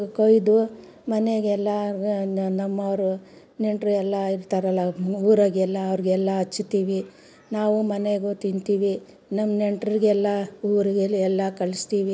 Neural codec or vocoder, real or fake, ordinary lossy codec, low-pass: none; real; none; none